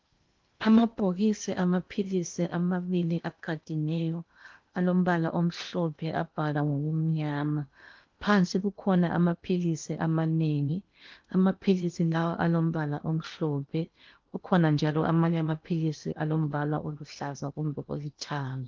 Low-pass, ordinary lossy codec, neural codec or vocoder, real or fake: 7.2 kHz; Opus, 32 kbps; codec, 16 kHz in and 24 kHz out, 0.8 kbps, FocalCodec, streaming, 65536 codes; fake